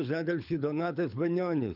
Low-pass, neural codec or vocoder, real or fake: 5.4 kHz; codec, 24 kHz, 6 kbps, HILCodec; fake